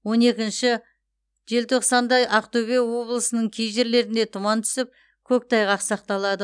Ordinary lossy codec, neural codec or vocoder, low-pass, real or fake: none; none; 9.9 kHz; real